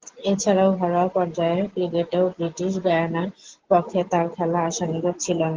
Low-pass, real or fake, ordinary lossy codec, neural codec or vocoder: 7.2 kHz; real; Opus, 16 kbps; none